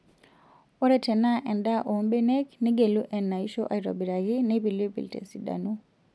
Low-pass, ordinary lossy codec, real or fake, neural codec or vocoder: none; none; real; none